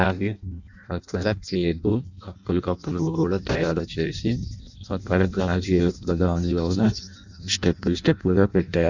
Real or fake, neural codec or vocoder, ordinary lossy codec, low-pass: fake; codec, 16 kHz in and 24 kHz out, 0.6 kbps, FireRedTTS-2 codec; none; 7.2 kHz